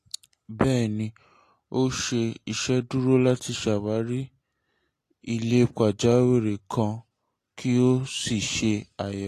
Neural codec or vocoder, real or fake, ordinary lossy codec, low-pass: none; real; AAC, 48 kbps; 14.4 kHz